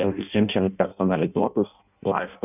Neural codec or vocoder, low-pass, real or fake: codec, 16 kHz in and 24 kHz out, 0.6 kbps, FireRedTTS-2 codec; 3.6 kHz; fake